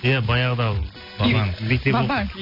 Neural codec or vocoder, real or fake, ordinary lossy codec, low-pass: none; real; MP3, 32 kbps; 5.4 kHz